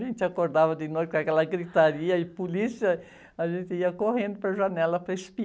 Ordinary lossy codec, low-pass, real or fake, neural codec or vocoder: none; none; real; none